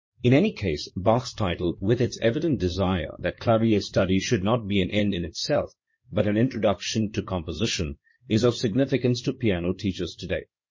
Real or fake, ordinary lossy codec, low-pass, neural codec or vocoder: fake; MP3, 32 kbps; 7.2 kHz; codec, 16 kHz in and 24 kHz out, 2.2 kbps, FireRedTTS-2 codec